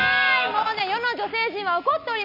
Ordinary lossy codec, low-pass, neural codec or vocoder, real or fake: none; 5.4 kHz; none; real